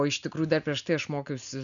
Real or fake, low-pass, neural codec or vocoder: real; 7.2 kHz; none